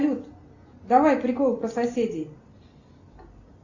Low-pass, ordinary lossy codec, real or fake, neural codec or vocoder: 7.2 kHz; Opus, 64 kbps; real; none